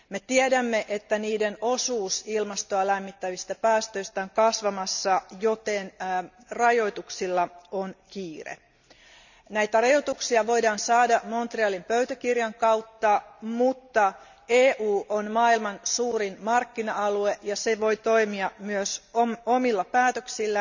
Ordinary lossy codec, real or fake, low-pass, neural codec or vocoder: none; real; 7.2 kHz; none